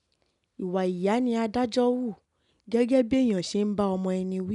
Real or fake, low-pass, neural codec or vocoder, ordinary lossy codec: real; 10.8 kHz; none; none